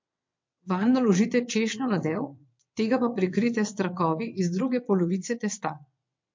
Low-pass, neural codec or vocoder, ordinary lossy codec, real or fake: 7.2 kHz; vocoder, 22.05 kHz, 80 mel bands, WaveNeXt; MP3, 48 kbps; fake